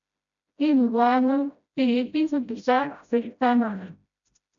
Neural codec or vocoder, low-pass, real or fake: codec, 16 kHz, 0.5 kbps, FreqCodec, smaller model; 7.2 kHz; fake